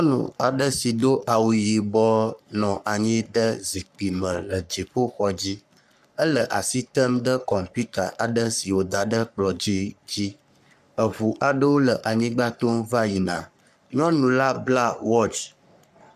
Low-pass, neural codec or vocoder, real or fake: 14.4 kHz; codec, 44.1 kHz, 3.4 kbps, Pupu-Codec; fake